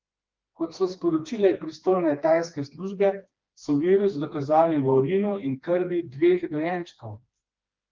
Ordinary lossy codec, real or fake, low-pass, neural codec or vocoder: Opus, 32 kbps; fake; 7.2 kHz; codec, 16 kHz, 2 kbps, FreqCodec, smaller model